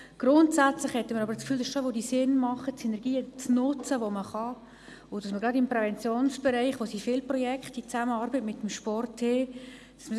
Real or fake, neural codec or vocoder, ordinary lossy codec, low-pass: real; none; none; none